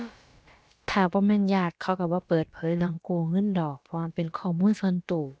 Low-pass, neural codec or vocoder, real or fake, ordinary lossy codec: none; codec, 16 kHz, about 1 kbps, DyCAST, with the encoder's durations; fake; none